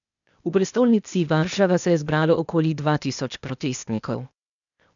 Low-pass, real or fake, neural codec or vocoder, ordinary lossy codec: 7.2 kHz; fake; codec, 16 kHz, 0.8 kbps, ZipCodec; none